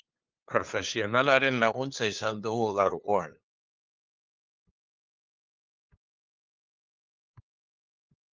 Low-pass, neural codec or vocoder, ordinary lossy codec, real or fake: 7.2 kHz; codec, 16 kHz, 2 kbps, FunCodec, trained on LibriTTS, 25 frames a second; Opus, 32 kbps; fake